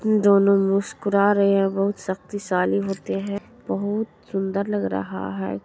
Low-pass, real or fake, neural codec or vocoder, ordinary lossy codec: none; real; none; none